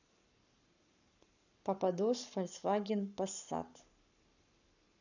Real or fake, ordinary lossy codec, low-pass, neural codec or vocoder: fake; none; 7.2 kHz; codec, 44.1 kHz, 7.8 kbps, Pupu-Codec